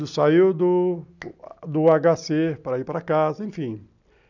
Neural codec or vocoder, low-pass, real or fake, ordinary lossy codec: none; 7.2 kHz; real; none